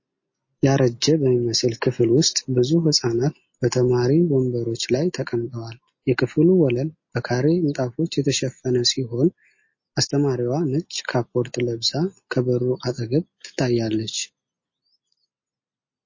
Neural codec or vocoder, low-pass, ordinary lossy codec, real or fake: none; 7.2 kHz; MP3, 32 kbps; real